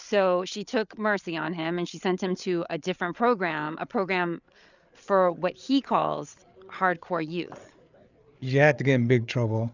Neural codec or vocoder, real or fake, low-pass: codec, 16 kHz, 8 kbps, FreqCodec, larger model; fake; 7.2 kHz